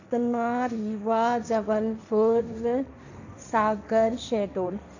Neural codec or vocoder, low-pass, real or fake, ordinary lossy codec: codec, 16 kHz, 1.1 kbps, Voila-Tokenizer; 7.2 kHz; fake; none